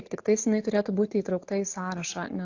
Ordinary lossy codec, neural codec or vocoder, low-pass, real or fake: AAC, 48 kbps; none; 7.2 kHz; real